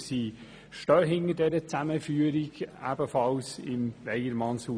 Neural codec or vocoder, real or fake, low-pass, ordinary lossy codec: none; real; 9.9 kHz; none